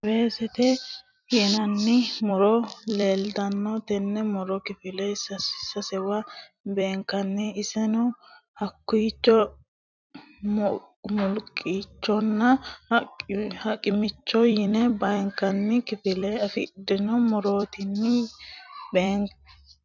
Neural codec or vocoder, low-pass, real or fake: vocoder, 44.1 kHz, 128 mel bands every 256 samples, BigVGAN v2; 7.2 kHz; fake